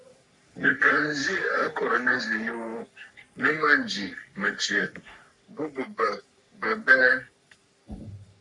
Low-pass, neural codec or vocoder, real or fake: 10.8 kHz; codec, 44.1 kHz, 3.4 kbps, Pupu-Codec; fake